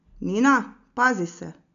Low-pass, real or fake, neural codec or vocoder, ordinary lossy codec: 7.2 kHz; real; none; none